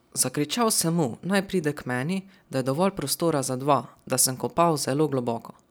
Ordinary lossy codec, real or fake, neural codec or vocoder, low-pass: none; real; none; none